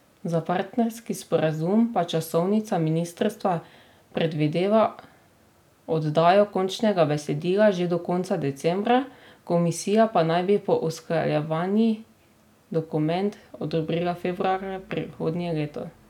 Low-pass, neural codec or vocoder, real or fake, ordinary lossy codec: 19.8 kHz; none; real; none